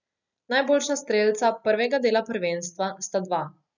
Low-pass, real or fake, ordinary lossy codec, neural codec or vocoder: 7.2 kHz; real; none; none